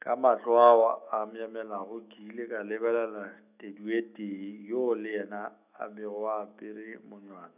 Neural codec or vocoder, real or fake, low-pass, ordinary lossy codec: autoencoder, 48 kHz, 128 numbers a frame, DAC-VAE, trained on Japanese speech; fake; 3.6 kHz; none